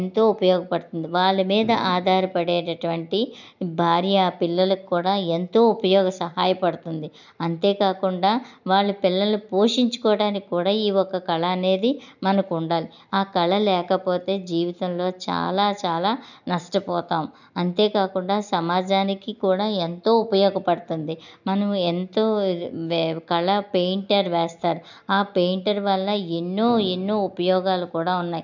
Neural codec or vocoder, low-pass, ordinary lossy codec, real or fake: none; 7.2 kHz; none; real